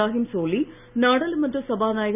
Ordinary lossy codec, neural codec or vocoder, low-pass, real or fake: none; none; 3.6 kHz; real